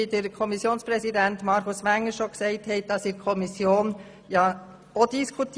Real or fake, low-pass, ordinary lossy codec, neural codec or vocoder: real; none; none; none